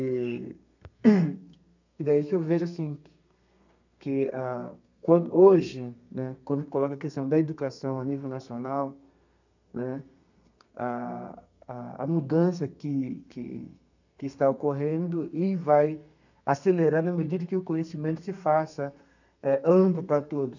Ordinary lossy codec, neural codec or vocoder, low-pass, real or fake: none; codec, 32 kHz, 1.9 kbps, SNAC; 7.2 kHz; fake